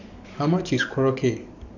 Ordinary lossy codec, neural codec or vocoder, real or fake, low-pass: none; codec, 44.1 kHz, 7.8 kbps, DAC; fake; 7.2 kHz